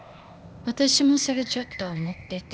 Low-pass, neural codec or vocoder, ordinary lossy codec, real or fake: none; codec, 16 kHz, 0.8 kbps, ZipCodec; none; fake